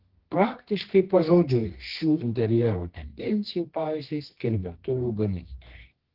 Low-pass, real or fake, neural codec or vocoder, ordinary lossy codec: 5.4 kHz; fake; codec, 24 kHz, 0.9 kbps, WavTokenizer, medium music audio release; Opus, 16 kbps